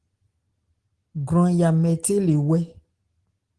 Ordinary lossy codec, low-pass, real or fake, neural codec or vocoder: Opus, 16 kbps; 10.8 kHz; real; none